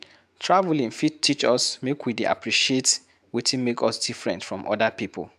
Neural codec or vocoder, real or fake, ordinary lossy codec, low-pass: autoencoder, 48 kHz, 128 numbers a frame, DAC-VAE, trained on Japanese speech; fake; MP3, 96 kbps; 14.4 kHz